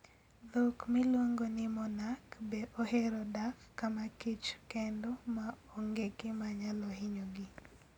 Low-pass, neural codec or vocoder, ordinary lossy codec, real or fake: 19.8 kHz; none; none; real